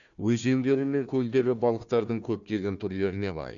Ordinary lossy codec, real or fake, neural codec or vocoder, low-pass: none; fake; codec, 16 kHz, 1 kbps, FunCodec, trained on Chinese and English, 50 frames a second; 7.2 kHz